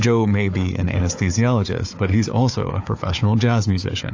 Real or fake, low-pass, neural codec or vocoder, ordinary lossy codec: fake; 7.2 kHz; codec, 16 kHz, 4 kbps, FunCodec, trained on Chinese and English, 50 frames a second; AAC, 48 kbps